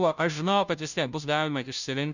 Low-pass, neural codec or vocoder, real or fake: 7.2 kHz; codec, 16 kHz, 0.5 kbps, FunCodec, trained on Chinese and English, 25 frames a second; fake